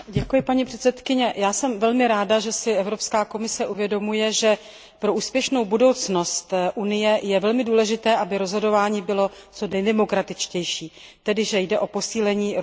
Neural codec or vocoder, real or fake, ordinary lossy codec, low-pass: none; real; none; none